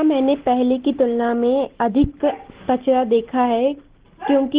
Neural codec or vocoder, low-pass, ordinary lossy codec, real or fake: none; 3.6 kHz; Opus, 16 kbps; real